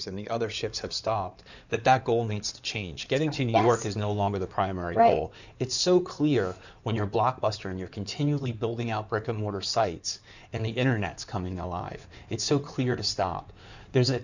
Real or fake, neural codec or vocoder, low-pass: fake; codec, 16 kHz in and 24 kHz out, 2.2 kbps, FireRedTTS-2 codec; 7.2 kHz